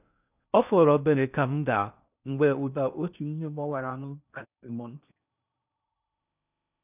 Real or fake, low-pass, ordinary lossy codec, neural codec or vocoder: fake; 3.6 kHz; none; codec, 16 kHz in and 24 kHz out, 0.6 kbps, FocalCodec, streaming, 4096 codes